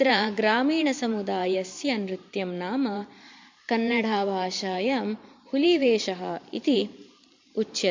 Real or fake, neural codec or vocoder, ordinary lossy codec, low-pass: fake; vocoder, 44.1 kHz, 128 mel bands every 512 samples, BigVGAN v2; MP3, 48 kbps; 7.2 kHz